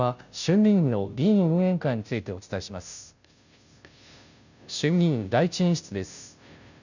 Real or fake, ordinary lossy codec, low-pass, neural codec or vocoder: fake; none; 7.2 kHz; codec, 16 kHz, 0.5 kbps, FunCodec, trained on Chinese and English, 25 frames a second